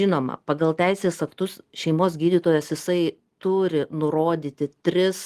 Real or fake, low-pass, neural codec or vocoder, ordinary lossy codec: real; 14.4 kHz; none; Opus, 24 kbps